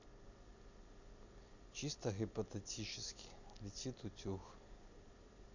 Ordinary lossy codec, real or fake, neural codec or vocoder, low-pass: none; real; none; 7.2 kHz